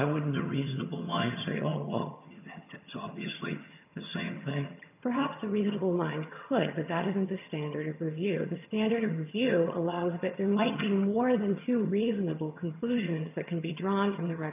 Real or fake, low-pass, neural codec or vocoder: fake; 3.6 kHz; vocoder, 22.05 kHz, 80 mel bands, HiFi-GAN